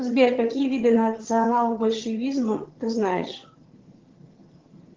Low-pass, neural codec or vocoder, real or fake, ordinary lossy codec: 7.2 kHz; vocoder, 22.05 kHz, 80 mel bands, HiFi-GAN; fake; Opus, 16 kbps